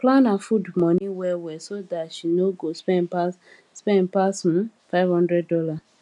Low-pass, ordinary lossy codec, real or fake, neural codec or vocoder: 10.8 kHz; none; real; none